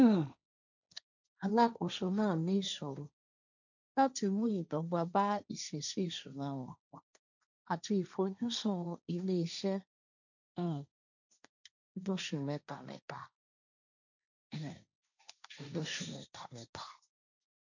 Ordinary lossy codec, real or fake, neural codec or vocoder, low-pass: none; fake; codec, 16 kHz, 1.1 kbps, Voila-Tokenizer; 7.2 kHz